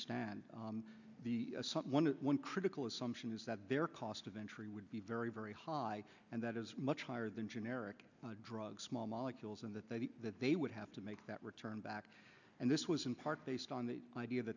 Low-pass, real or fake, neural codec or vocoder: 7.2 kHz; real; none